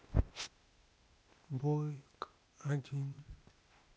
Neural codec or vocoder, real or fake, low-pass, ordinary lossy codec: codec, 16 kHz, 0.8 kbps, ZipCodec; fake; none; none